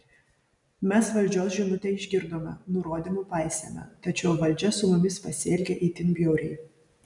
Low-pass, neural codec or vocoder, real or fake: 10.8 kHz; none; real